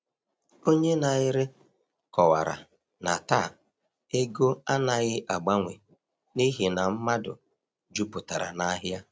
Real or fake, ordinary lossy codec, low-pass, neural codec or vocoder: real; none; none; none